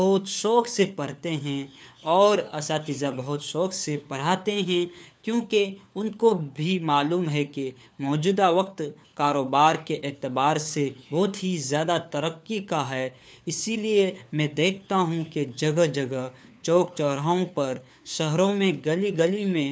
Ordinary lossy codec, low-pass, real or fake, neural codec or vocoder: none; none; fake; codec, 16 kHz, 4 kbps, FunCodec, trained on LibriTTS, 50 frames a second